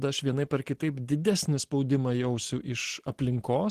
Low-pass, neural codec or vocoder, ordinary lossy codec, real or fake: 14.4 kHz; none; Opus, 16 kbps; real